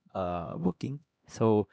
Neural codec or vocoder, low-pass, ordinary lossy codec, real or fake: codec, 16 kHz, 1 kbps, X-Codec, HuBERT features, trained on LibriSpeech; none; none; fake